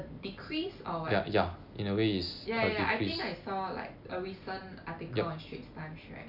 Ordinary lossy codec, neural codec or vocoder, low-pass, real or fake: none; none; 5.4 kHz; real